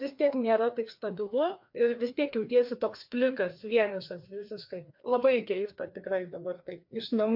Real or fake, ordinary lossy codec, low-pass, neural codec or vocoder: fake; MP3, 48 kbps; 5.4 kHz; codec, 16 kHz, 2 kbps, FreqCodec, larger model